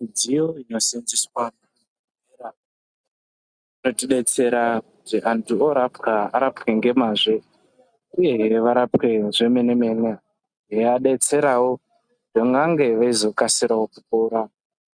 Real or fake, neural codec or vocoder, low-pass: real; none; 9.9 kHz